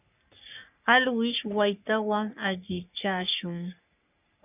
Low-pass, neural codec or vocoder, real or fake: 3.6 kHz; codec, 44.1 kHz, 3.4 kbps, Pupu-Codec; fake